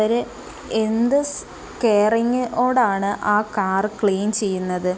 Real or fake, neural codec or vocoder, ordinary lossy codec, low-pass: real; none; none; none